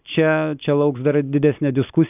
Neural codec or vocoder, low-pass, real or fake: none; 3.6 kHz; real